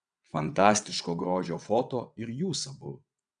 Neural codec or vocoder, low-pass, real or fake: vocoder, 22.05 kHz, 80 mel bands, WaveNeXt; 9.9 kHz; fake